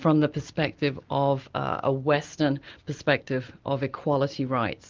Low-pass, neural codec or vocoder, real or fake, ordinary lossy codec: 7.2 kHz; none; real; Opus, 24 kbps